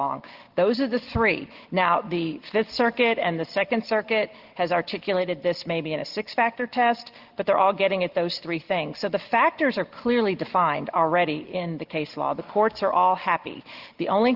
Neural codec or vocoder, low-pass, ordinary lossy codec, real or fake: none; 5.4 kHz; Opus, 16 kbps; real